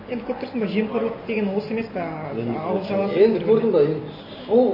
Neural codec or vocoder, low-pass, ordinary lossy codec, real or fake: none; 5.4 kHz; MP3, 24 kbps; real